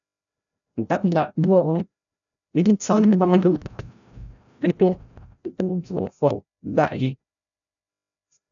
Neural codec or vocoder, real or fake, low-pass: codec, 16 kHz, 0.5 kbps, FreqCodec, larger model; fake; 7.2 kHz